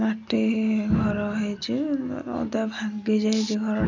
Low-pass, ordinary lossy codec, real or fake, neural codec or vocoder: 7.2 kHz; none; real; none